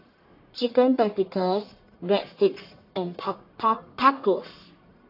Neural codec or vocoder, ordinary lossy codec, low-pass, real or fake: codec, 44.1 kHz, 1.7 kbps, Pupu-Codec; AAC, 32 kbps; 5.4 kHz; fake